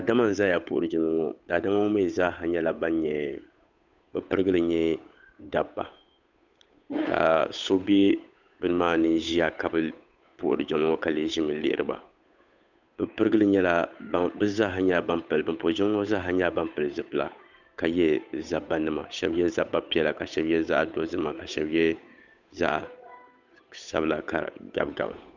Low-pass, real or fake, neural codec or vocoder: 7.2 kHz; fake; codec, 16 kHz, 8 kbps, FunCodec, trained on Chinese and English, 25 frames a second